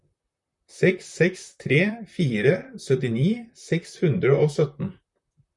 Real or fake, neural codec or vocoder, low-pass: fake; vocoder, 44.1 kHz, 128 mel bands, Pupu-Vocoder; 10.8 kHz